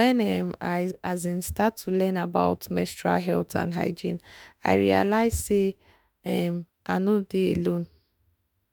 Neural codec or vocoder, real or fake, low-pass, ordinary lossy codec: autoencoder, 48 kHz, 32 numbers a frame, DAC-VAE, trained on Japanese speech; fake; none; none